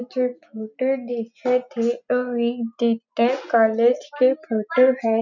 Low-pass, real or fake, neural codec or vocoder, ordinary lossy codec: 7.2 kHz; real; none; none